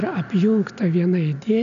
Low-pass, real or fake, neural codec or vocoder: 7.2 kHz; real; none